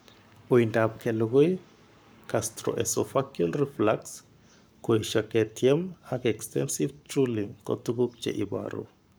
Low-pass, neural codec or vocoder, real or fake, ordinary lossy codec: none; codec, 44.1 kHz, 7.8 kbps, Pupu-Codec; fake; none